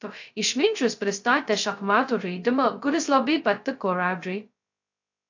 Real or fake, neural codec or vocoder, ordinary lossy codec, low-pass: fake; codec, 16 kHz, 0.2 kbps, FocalCodec; AAC, 48 kbps; 7.2 kHz